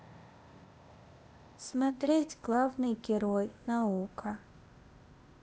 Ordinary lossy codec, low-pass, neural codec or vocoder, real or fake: none; none; codec, 16 kHz, 0.8 kbps, ZipCodec; fake